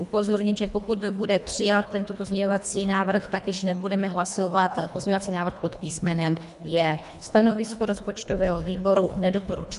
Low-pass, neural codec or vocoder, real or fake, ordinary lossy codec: 10.8 kHz; codec, 24 kHz, 1.5 kbps, HILCodec; fake; MP3, 96 kbps